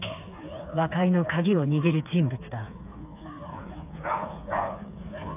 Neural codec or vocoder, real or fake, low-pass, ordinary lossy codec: codec, 16 kHz, 4 kbps, FreqCodec, smaller model; fake; 3.6 kHz; AAC, 32 kbps